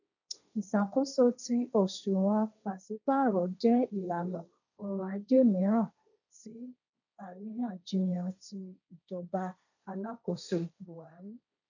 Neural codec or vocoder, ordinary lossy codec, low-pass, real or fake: codec, 16 kHz, 1.1 kbps, Voila-Tokenizer; none; 7.2 kHz; fake